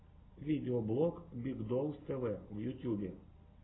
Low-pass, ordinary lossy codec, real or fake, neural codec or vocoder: 7.2 kHz; AAC, 16 kbps; real; none